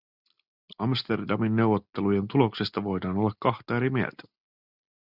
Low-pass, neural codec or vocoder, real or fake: 5.4 kHz; none; real